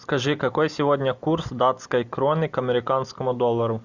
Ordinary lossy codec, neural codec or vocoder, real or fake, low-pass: Opus, 64 kbps; none; real; 7.2 kHz